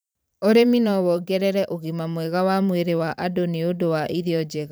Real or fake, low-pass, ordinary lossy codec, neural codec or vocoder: fake; none; none; vocoder, 44.1 kHz, 128 mel bands every 512 samples, BigVGAN v2